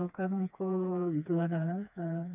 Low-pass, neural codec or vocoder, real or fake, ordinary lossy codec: 3.6 kHz; codec, 16 kHz, 2 kbps, FreqCodec, smaller model; fake; none